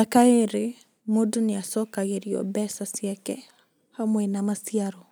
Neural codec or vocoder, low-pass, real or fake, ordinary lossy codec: none; none; real; none